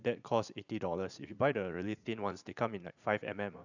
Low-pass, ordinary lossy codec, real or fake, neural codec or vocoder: 7.2 kHz; none; fake; vocoder, 44.1 kHz, 80 mel bands, Vocos